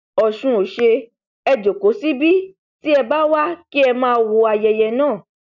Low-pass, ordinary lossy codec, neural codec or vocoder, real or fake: 7.2 kHz; none; none; real